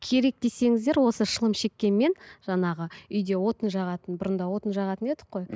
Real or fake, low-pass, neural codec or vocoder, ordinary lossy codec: real; none; none; none